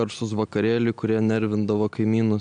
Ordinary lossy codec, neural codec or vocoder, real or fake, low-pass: MP3, 96 kbps; none; real; 9.9 kHz